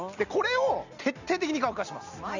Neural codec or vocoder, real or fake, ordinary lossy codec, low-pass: none; real; none; 7.2 kHz